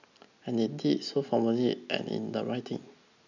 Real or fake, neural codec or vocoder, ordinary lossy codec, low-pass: real; none; none; 7.2 kHz